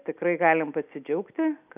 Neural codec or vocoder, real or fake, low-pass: none; real; 3.6 kHz